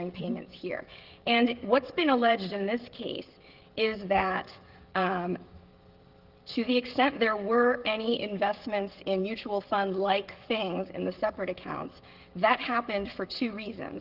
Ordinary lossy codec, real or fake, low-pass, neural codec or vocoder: Opus, 16 kbps; fake; 5.4 kHz; codec, 16 kHz, 16 kbps, FreqCodec, smaller model